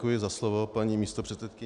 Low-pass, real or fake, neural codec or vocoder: 10.8 kHz; real; none